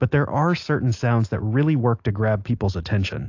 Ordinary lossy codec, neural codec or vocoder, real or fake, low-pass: AAC, 48 kbps; none; real; 7.2 kHz